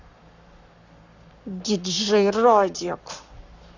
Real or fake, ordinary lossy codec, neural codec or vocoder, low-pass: fake; none; codec, 44.1 kHz, 7.8 kbps, Pupu-Codec; 7.2 kHz